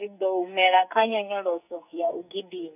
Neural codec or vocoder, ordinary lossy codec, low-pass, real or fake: codec, 44.1 kHz, 2.6 kbps, SNAC; none; 3.6 kHz; fake